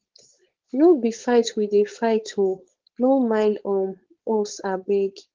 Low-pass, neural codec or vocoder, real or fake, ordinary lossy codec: 7.2 kHz; codec, 16 kHz, 4.8 kbps, FACodec; fake; Opus, 16 kbps